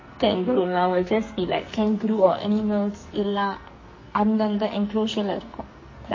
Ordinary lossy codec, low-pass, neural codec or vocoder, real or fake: MP3, 32 kbps; 7.2 kHz; codec, 44.1 kHz, 2.6 kbps, SNAC; fake